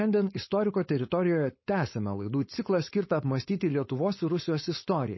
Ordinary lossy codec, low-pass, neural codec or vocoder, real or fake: MP3, 24 kbps; 7.2 kHz; codec, 16 kHz, 4.8 kbps, FACodec; fake